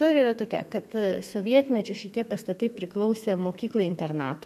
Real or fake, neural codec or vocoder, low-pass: fake; codec, 32 kHz, 1.9 kbps, SNAC; 14.4 kHz